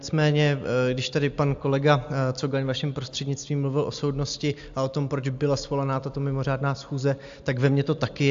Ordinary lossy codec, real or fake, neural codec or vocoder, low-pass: AAC, 64 kbps; real; none; 7.2 kHz